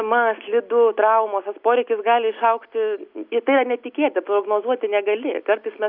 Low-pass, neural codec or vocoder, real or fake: 5.4 kHz; none; real